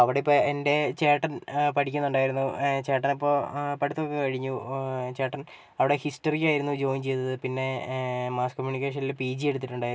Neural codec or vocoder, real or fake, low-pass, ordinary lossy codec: none; real; none; none